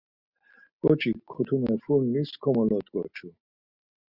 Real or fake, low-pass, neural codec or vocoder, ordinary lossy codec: real; 5.4 kHz; none; Opus, 64 kbps